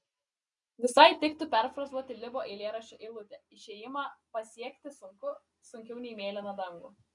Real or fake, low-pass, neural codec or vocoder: real; 10.8 kHz; none